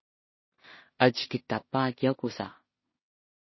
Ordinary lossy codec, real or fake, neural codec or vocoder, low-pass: MP3, 24 kbps; fake; codec, 16 kHz in and 24 kHz out, 0.4 kbps, LongCat-Audio-Codec, two codebook decoder; 7.2 kHz